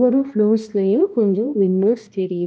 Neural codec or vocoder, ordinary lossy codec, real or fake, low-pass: codec, 16 kHz, 1 kbps, X-Codec, HuBERT features, trained on balanced general audio; none; fake; none